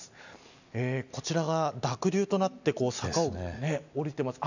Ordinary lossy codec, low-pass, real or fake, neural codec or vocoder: none; 7.2 kHz; real; none